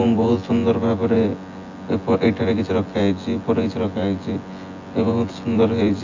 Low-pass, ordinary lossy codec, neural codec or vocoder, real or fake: 7.2 kHz; AAC, 48 kbps; vocoder, 24 kHz, 100 mel bands, Vocos; fake